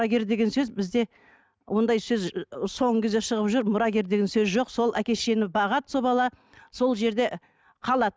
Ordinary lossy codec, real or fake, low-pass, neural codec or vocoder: none; real; none; none